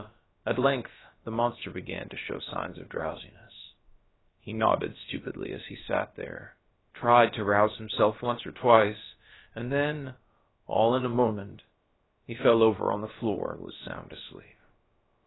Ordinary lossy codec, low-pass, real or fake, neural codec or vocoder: AAC, 16 kbps; 7.2 kHz; fake; codec, 16 kHz, about 1 kbps, DyCAST, with the encoder's durations